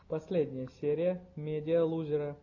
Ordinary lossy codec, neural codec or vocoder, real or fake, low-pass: AAC, 48 kbps; none; real; 7.2 kHz